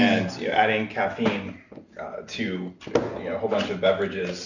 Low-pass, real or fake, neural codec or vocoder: 7.2 kHz; real; none